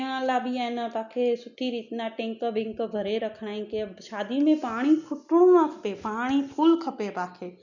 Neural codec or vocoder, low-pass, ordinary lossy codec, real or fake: none; 7.2 kHz; none; real